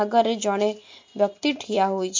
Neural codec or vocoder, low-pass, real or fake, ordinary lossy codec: none; 7.2 kHz; real; MP3, 48 kbps